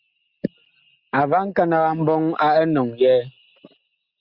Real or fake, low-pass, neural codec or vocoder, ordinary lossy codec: real; 5.4 kHz; none; Opus, 64 kbps